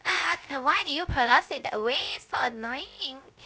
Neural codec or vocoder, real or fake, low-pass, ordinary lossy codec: codec, 16 kHz, 0.3 kbps, FocalCodec; fake; none; none